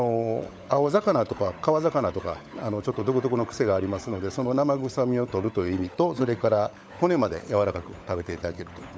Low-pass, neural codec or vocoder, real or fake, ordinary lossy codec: none; codec, 16 kHz, 16 kbps, FunCodec, trained on LibriTTS, 50 frames a second; fake; none